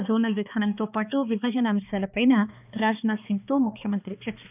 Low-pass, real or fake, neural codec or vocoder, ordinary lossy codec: 3.6 kHz; fake; codec, 16 kHz, 2 kbps, X-Codec, HuBERT features, trained on balanced general audio; none